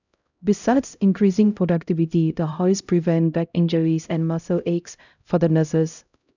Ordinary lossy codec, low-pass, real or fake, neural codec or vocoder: none; 7.2 kHz; fake; codec, 16 kHz, 0.5 kbps, X-Codec, HuBERT features, trained on LibriSpeech